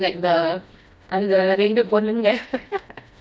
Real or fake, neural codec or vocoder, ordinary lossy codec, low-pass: fake; codec, 16 kHz, 1 kbps, FreqCodec, smaller model; none; none